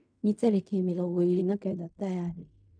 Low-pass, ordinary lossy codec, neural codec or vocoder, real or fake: 9.9 kHz; none; codec, 16 kHz in and 24 kHz out, 0.4 kbps, LongCat-Audio-Codec, fine tuned four codebook decoder; fake